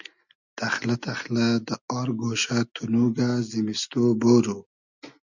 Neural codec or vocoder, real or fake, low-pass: none; real; 7.2 kHz